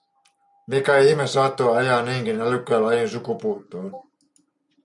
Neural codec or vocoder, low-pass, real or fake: none; 10.8 kHz; real